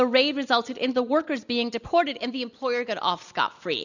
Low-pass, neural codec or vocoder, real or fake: 7.2 kHz; none; real